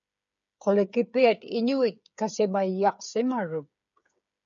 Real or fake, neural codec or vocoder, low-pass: fake; codec, 16 kHz, 8 kbps, FreqCodec, smaller model; 7.2 kHz